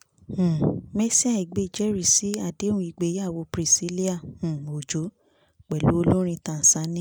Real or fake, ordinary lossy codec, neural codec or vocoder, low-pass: real; none; none; none